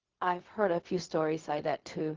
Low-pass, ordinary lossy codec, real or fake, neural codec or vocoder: 7.2 kHz; Opus, 16 kbps; fake; codec, 16 kHz, 0.4 kbps, LongCat-Audio-Codec